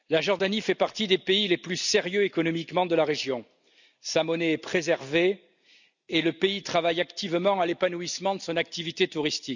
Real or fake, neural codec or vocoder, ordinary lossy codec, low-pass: real; none; none; 7.2 kHz